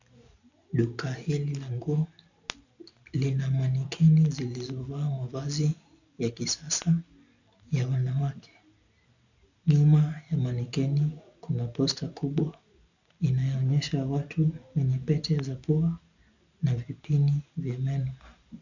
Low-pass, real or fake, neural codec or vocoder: 7.2 kHz; real; none